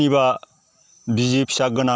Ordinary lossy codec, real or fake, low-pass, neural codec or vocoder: none; real; none; none